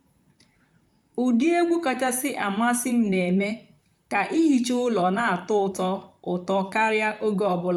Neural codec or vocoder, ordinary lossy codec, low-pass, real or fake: vocoder, 44.1 kHz, 128 mel bands, Pupu-Vocoder; none; 19.8 kHz; fake